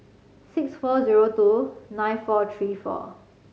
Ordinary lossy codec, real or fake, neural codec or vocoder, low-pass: none; real; none; none